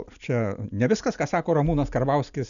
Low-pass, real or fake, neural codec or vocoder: 7.2 kHz; real; none